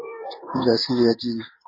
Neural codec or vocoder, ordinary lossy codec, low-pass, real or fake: codec, 16 kHz in and 24 kHz out, 1 kbps, XY-Tokenizer; MP3, 24 kbps; 5.4 kHz; fake